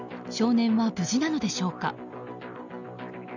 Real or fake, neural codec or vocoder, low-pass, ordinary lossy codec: real; none; 7.2 kHz; none